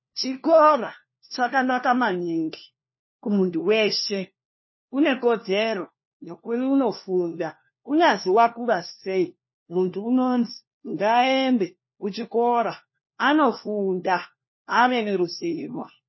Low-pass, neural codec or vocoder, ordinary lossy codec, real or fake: 7.2 kHz; codec, 16 kHz, 1 kbps, FunCodec, trained on LibriTTS, 50 frames a second; MP3, 24 kbps; fake